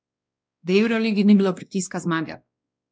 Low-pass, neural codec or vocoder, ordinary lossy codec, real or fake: none; codec, 16 kHz, 1 kbps, X-Codec, WavLM features, trained on Multilingual LibriSpeech; none; fake